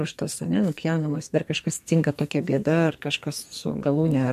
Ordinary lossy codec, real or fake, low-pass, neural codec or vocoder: MP3, 64 kbps; fake; 14.4 kHz; codec, 44.1 kHz, 2.6 kbps, SNAC